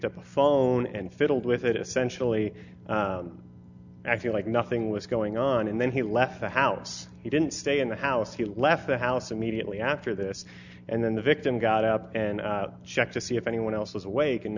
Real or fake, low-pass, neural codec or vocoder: real; 7.2 kHz; none